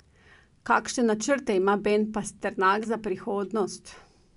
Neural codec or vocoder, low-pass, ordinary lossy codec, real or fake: none; 10.8 kHz; none; real